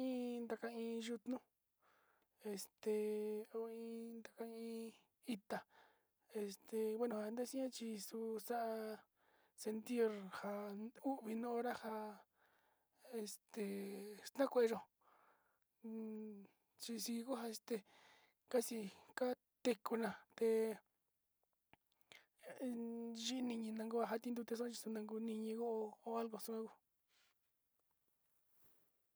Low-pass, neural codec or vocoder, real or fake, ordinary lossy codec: none; none; real; none